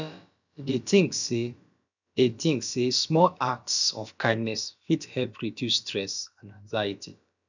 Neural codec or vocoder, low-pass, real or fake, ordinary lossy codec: codec, 16 kHz, about 1 kbps, DyCAST, with the encoder's durations; 7.2 kHz; fake; none